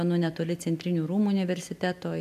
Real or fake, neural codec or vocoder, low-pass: real; none; 14.4 kHz